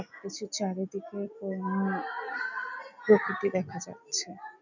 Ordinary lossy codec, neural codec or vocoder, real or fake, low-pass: none; none; real; 7.2 kHz